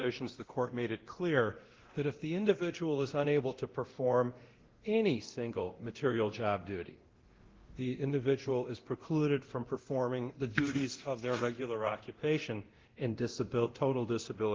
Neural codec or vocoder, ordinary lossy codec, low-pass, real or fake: codec, 24 kHz, 0.9 kbps, DualCodec; Opus, 16 kbps; 7.2 kHz; fake